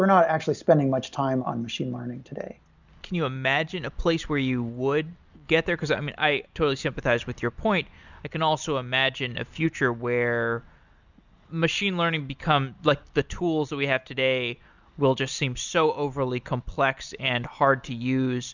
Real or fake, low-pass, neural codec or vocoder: real; 7.2 kHz; none